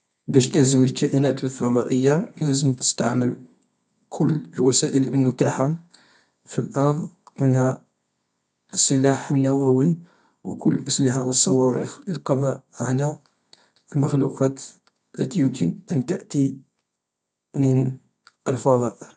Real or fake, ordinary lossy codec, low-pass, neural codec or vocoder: fake; none; 10.8 kHz; codec, 24 kHz, 0.9 kbps, WavTokenizer, medium music audio release